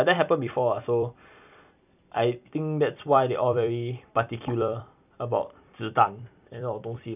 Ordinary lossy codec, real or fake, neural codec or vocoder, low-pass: none; fake; vocoder, 44.1 kHz, 128 mel bands every 256 samples, BigVGAN v2; 3.6 kHz